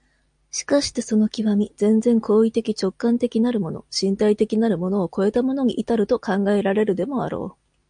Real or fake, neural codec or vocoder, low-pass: real; none; 9.9 kHz